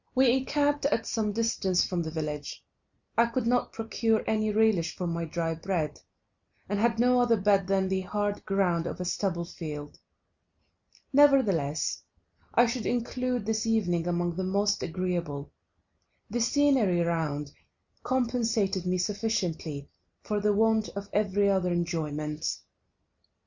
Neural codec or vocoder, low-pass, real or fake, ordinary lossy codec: none; 7.2 kHz; real; Opus, 64 kbps